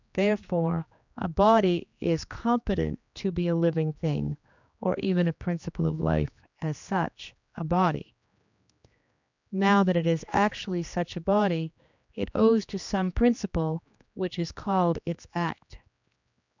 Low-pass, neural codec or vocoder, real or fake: 7.2 kHz; codec, 16 kHz, 2 kbps, X-Codec, HuBERT features, trained on general audio; fake